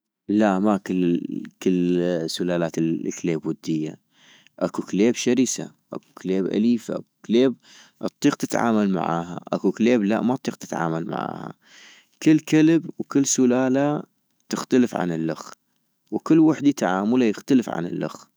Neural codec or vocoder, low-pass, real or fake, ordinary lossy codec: autoencoder, 48 kHz, 128 numbers a frame, DAC-VAE, trained on Japanese speech; none; fake; none